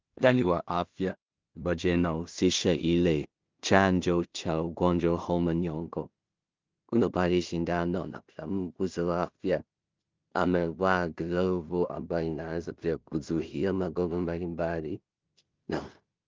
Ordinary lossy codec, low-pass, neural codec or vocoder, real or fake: Opus, 24 kbps; 7.2 kHz; codec, 16 kHz in and 24 kHz out, 0.4 kbps, LongCat-Audio-Codec, two codebook decoder; fake